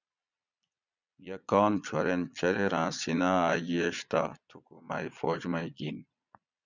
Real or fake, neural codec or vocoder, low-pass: fake; vocoder, 22.05 kHz, 80 mel bands, Vocos; 7.2 kHz